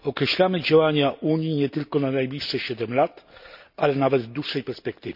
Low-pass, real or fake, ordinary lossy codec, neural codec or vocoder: 5.4 kHz; real; none; none